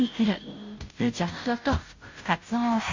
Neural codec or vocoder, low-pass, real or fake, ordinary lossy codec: codec, 16 kHz, 0.5 kbps, FunCodec, trained on Chinese and English, 25 frames a second; 7.2 kHz; fake; MP3, 64 kbps